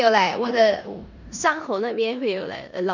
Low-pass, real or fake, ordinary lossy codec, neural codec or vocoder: 7.2 kHz; fake; none; codec, 16 kHz in and 24 kHz out, 0.9 kbps, LongCat-Audio-Codec, four codebook decoder